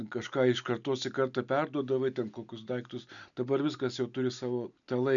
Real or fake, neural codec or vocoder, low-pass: real; none; 7.2 kHz